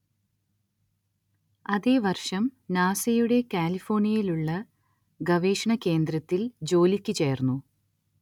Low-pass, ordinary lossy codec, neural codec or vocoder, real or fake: 19.8 kHz; none; none; real